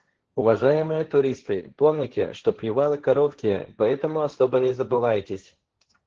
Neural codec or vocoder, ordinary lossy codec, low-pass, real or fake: codec, 16 kHz, 1.1 kbps, Voila-Tokenizer; Opus, 16 kbps; 7.2 kHz; fake